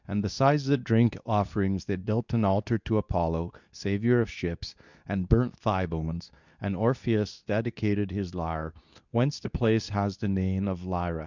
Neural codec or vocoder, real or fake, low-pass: codec, 24 kHz, 0.9 kbps, WavTokenizer, medium speech release version 2; fake; 7.2 kHz